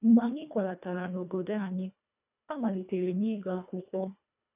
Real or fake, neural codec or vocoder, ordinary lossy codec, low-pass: fake; codec, 24 kHz, 1.5 kbps, HILCodec; none; 3.6 kHz